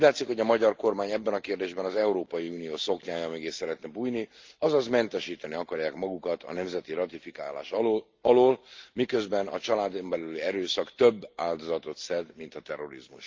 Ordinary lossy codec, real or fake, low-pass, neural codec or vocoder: Opus, 24 kbps; real; 7.2 kHz; none